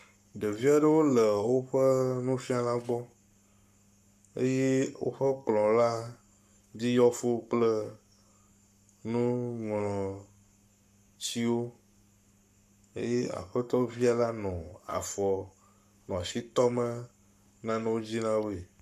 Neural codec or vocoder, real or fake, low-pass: codec, 44.1 kHz, 7.8 kbps, Pupu-Codec; fake; 14.4 kHz